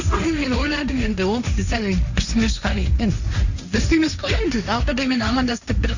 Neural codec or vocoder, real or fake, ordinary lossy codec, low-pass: codec, 16 kHz, 1.1 kbps, Voila-Tokenizer; fake; none; 7.2 kHz